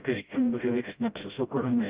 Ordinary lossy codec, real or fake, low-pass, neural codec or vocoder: Opus, 32 kbps; fake; 3.6 kHz; codec, 16 kHz, 0.5 kbps, FreqCodec, smaller model